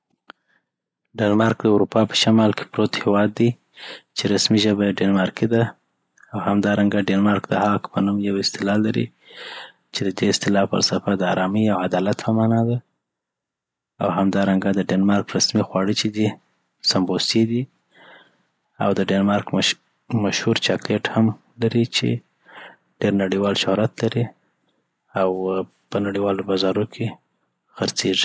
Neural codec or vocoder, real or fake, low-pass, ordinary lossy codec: none; real; none; none